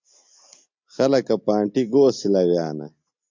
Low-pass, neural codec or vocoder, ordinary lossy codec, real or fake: 7.2 kHz; none; MP3, 64 kbps; real